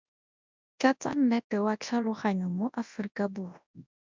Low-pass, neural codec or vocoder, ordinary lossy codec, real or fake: 7.2 kHz; codec, 24 kHz, 0.9 kbps, WavTokenizer, large speech release; MP3, 64 kbps; fake